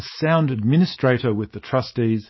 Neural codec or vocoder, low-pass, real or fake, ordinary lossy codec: autoencoder, 48 kHz, 128 numbers a frame, DAC-VAE, trained on Japanese speech; 7.2 kHz; fake; MP3, 24 kbps